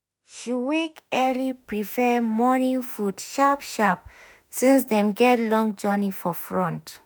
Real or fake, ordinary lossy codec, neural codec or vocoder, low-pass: fake; none; autoencoder, 48 kHz, 32 numbers a frame, DAC-VAE, trained on Japanese speech; none